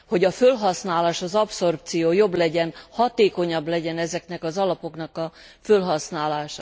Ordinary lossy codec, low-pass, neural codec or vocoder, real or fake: none; none; none; real